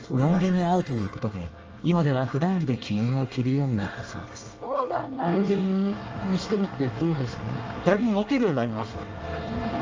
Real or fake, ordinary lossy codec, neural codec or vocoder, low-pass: fake; Opus, 24 kbps; codec, 24 kHz, 1 kbps, SNAC; 7.2 kHz